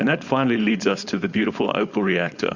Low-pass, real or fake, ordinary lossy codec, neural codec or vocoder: 7.2 kHz; fake; Opus, 64 kbps; codec, 16 kHz, 4.8 kbps, FACodec